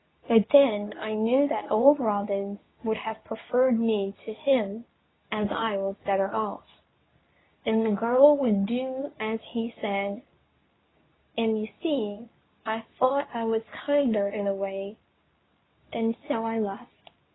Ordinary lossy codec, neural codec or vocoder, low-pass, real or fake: AAC, 16 kbps; codec, 24 kHz, 0.9 kbps, WavTokenizer, medium speech release version 2; 7.2 kHz; fake